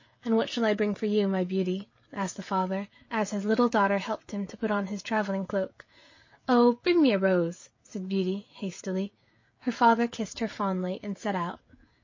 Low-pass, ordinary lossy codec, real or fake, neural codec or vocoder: 7.2 kHz; MP3, 32 kbps; fake; codec, 16 kHz, 16 kbps, FreqCodec, smaller model